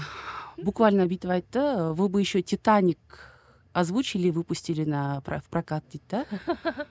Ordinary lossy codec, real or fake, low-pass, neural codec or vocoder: none; real; none; none